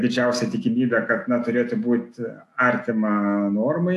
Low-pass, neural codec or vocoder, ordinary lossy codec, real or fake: 14.4 kHz; none; AAC, 64 kbps; real